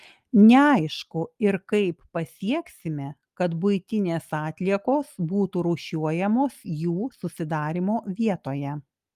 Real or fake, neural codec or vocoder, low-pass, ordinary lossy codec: real; none; 14.4 kHz; Opus, 32 kbps